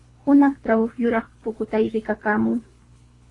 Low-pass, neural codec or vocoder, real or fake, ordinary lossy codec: 10.8 kHz; codec, 24 kHz, 3 kbps, HILCodec; fake; AAC, 32 kbps